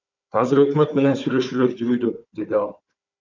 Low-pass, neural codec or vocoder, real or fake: 7.2 kHz; codec, 16 kHz, 4 kbps, FunCodec, trained on Chinese and English, 50 frames a second; fake